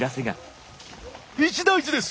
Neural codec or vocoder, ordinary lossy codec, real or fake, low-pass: none; none; real; none